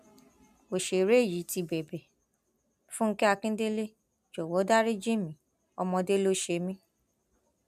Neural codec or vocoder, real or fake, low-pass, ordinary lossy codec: none; real; 14.4 kHz; none